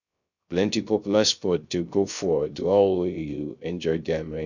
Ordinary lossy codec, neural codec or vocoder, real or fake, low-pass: none; codec, 16 kHz, 0.3 kbps, FocalCodec; fake; 7.2 kHz